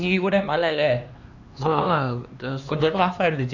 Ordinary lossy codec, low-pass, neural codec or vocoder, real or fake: none; 7.2 kHz; codec, 16 kHz, 2 kbps, X-Codec, HuBERT features, trained on LibriSpeech; fake